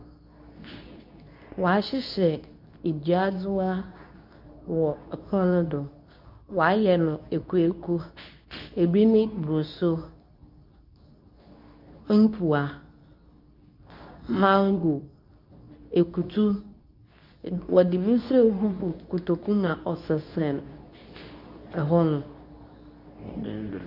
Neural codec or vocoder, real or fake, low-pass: codec, 24 kHz, 0.9 kbps, WavTokenizer, medium speech release version 2; fake; 5.4 kHz